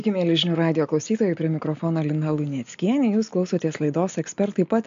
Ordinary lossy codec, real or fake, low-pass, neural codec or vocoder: AAC, 64 kbps; real; 7.2 kHz; none